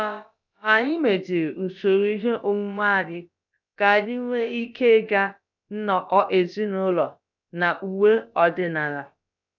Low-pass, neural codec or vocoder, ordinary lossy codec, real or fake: 7.2 kHz; codec, 16 kHz, about 1 kbps, DyCAST, with the encoder's durations; none; fake